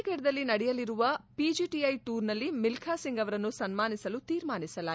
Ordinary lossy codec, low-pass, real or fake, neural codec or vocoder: none; none; real; none